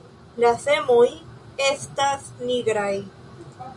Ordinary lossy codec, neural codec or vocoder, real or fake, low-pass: MP3, 64 kbps; none; real; 10.8 kHz